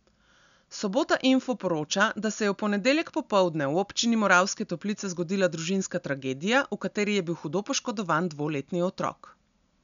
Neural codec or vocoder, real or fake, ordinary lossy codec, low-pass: none; real; none; 7.2 kHz